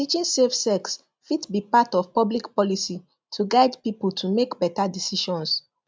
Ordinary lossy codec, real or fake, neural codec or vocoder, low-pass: none; real; none; none